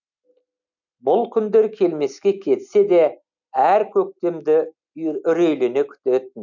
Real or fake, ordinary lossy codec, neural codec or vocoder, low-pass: real; none; none; 7.2 kHz